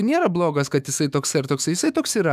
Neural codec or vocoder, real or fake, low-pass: autoencoder, 48 kHz, 128 numbers a frame, DAC-VAE, trained on Japanese speech; fake; 14.4 kHz